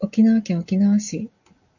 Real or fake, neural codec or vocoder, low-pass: real; none; 7.2 kHz